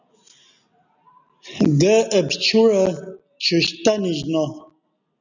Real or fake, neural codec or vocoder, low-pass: real; none; 7.2 kHz